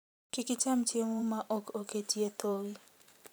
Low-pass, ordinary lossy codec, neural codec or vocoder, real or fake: none; none; vocoder, 44.1 kHz, 128 mel bands every 512 samples, BigVGAN v2; fake